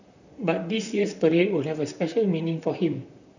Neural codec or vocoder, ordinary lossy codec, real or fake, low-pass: vocoder, 44.1 kHz, 128 mel bands, Pupu-Vocoder; none; fake; 7.2 kHz